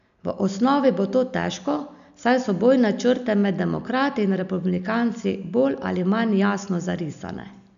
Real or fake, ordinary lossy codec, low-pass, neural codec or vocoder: real; none; 7.2 kHz; none